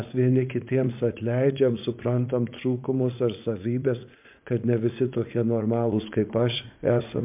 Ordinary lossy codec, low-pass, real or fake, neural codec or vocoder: MP3, 32 kbps; 3.6 kHz; fake; vocoder, 44.1 kHz, 80 mel bands, Vocos